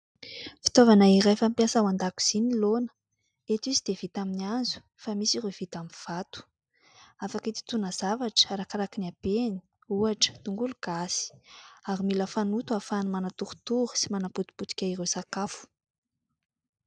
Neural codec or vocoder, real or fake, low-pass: none; real; 9.9 kHz